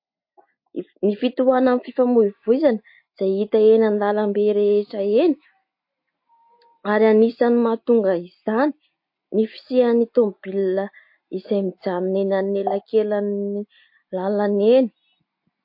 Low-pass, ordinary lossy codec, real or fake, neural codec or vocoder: 5.4 kHz; MP3, 32 kbps; real; none